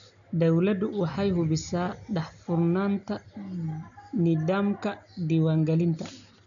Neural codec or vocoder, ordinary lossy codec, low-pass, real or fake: none; none; 7.2 kHz; real